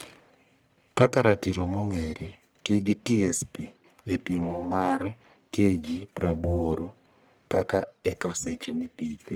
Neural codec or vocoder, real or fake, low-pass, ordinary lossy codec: codec, 44.1 kHz, 1.7 kbps, Pupu-Codec; fake; none; none